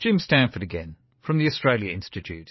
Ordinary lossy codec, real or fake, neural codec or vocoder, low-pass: MP3, 24 kbps; fake; vocoder, 22.05 kHz, 80 mel bands, Vocos; 7.2 kHz